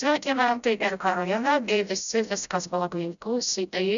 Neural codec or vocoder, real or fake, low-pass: codec, 16 kHz, 0.5 kbps, FreqCodec, smaller model; fake; 7.2 kHz